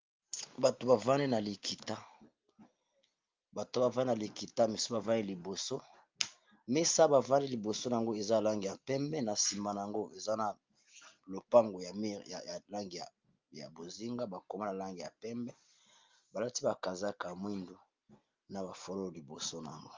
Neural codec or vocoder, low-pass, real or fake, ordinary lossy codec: none; 7.2 kHz; real; Opus, 32 kbps